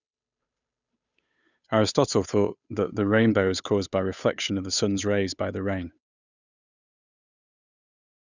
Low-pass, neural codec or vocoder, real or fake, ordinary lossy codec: 7.2 kHz; codec, 16 kHz, 8 kbps, FunCodec, trained on Chinese and English, 25 frames a second; fake; none